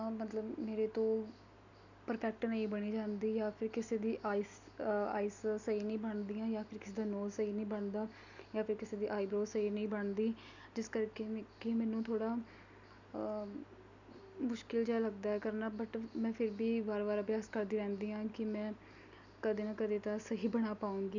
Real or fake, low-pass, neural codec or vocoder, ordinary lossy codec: real; 7.2 kHz; none; none